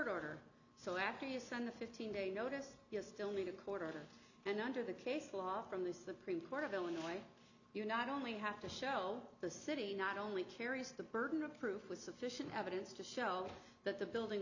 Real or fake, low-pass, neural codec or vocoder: real; 7.2 kHz; none